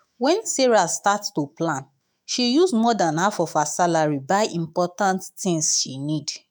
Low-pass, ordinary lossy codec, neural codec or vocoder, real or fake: none; none; autoencoder, 48 kHz, 128 numbers a frame, DAC-VAE, trained on Japanese speech; fake